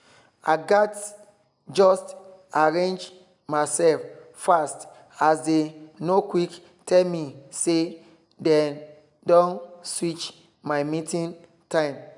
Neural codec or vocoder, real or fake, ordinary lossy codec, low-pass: none; real; none; 10.8 kHz